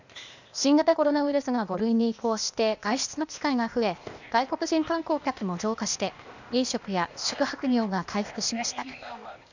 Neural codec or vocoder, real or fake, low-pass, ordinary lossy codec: codec, 16 kHz, 0.8 kbps, ZipCodec; fake; 7.2 kHz; none